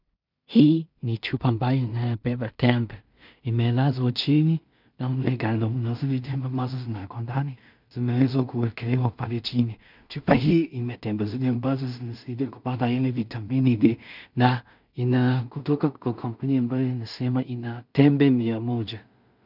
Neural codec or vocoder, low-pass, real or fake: codec, 16 kHz in and 24 kHz out, 0.4 kbps, LongCat-Audio-Codec, two codebook decoder; 5.4 kHz; fake